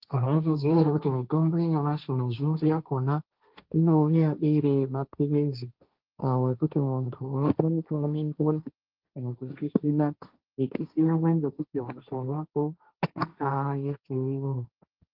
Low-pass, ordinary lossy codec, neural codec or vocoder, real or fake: 5.4 kHz; Opus, 32 kbps; codec, 16 kHz, 1.1 kbps, Voila-Tokenizer; fake